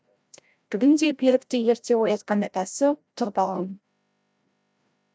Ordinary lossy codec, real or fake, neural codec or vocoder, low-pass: none; fake; codec, 16 kHz, 0.5 kbps, FreqCodec, larger model; none